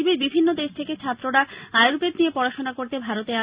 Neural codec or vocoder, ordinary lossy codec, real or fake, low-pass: none; Opus, 64 kbps; real; 3.6 kHz